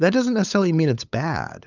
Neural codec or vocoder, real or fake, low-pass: codec, 16 kHz, 8 kbps, FunCodec, trained on LibriTTS, 25 frames a second; fake; 7.2 kHz